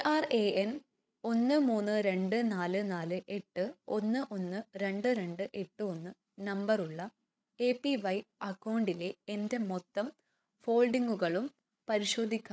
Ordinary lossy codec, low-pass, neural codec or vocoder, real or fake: none; none; codec, 16 kHz, 8 kbps, FreqCodec, larger model; fake